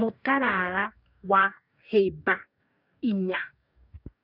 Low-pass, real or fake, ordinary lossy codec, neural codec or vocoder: 5.4 kHz; fake; none; codec, 44.1 kHz, 2.6 kbps, DAC